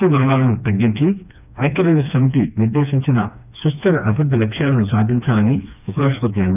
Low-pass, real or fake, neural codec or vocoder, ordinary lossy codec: 3.6 kHz; fake; codec, 16 kHz, 2 kbps, FreqCodec, smaller model; none